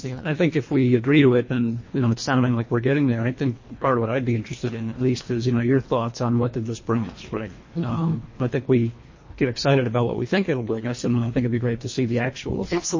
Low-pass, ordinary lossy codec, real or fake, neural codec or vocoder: 7.2 kHz; MP3, 32 kbps; fake; codec, 24 kHz, 1.5 kbps, HILCodec